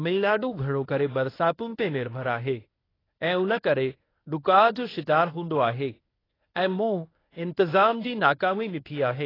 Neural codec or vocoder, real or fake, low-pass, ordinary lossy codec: codec, 24 kHz, 0.9 kbps, WavTokenizer, medium speech release version 1; fake; 5.4 kHz; AAC, 24 kbps